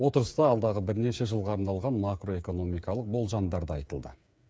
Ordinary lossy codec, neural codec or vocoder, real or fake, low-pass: none; codec, 16 kHz, 8 kbps, FreqCodec, smaller model; fake; none